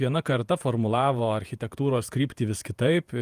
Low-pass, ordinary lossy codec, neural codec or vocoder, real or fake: 14.4 kHz; Opus, 32 kbps; vocoder, 48 kHz, 128 mel bands, Vocos; fake